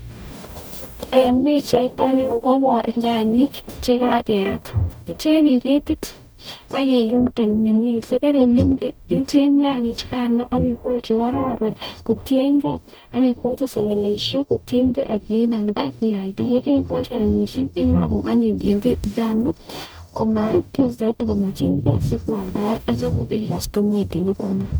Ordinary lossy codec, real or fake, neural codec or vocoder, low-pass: none; fake; codec, 44.1 kHz, 0.9 kbps, DAC; none